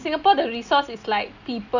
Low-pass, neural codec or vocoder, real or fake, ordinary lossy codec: 7.2 kHz; none; real; none